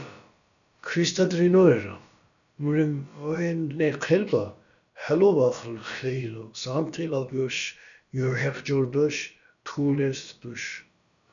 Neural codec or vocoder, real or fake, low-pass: codec, 16 kHz, about 1 kbps, DyCAST, with the encoder's durations; fake; 7.2 kHz